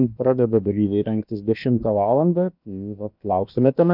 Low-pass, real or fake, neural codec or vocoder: 5.4 kHz; fake; codec, 16 kHz, about 1 kbps, DyCAST, with the encoder's durations